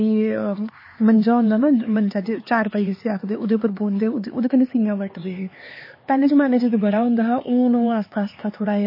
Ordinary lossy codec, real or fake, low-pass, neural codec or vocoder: MP3, 24 kbps; fake; 5.4 kHz; codec, 16 kHz, 4 kbps, X-Codec, HuBERT features, trained on LibriSpeech